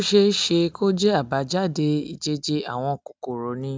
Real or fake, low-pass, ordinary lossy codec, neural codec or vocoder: real; none; none; none